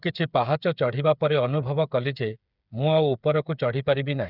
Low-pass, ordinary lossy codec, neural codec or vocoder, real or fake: 5.4 kHz; none; codec, 16 kHz, 8 kbps, FreqCodec, smaller model; fake